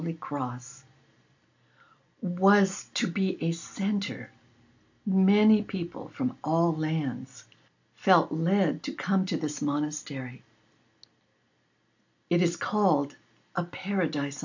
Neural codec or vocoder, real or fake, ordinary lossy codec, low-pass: none; real; AAC, 48 kbps; 7.2 kHz